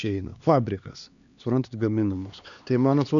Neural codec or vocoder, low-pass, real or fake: codec, 16 kHz, 2 kbps, X-Codec, HuBERT features, trained on LibriSpeech; 7.2 kHz; fake